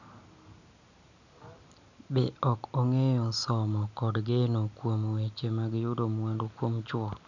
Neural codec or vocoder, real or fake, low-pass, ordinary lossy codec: none; real; 7.2 kHz; none